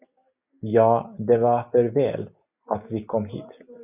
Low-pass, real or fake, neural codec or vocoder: 3.6 kHz; real; none